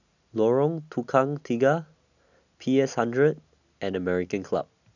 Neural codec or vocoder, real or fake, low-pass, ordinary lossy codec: none; real; 7.2 kHz; none